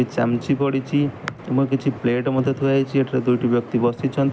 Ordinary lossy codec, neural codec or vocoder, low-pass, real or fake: none; none; none; real